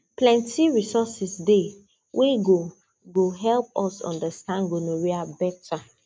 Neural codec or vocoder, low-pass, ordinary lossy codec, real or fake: none; none; none; real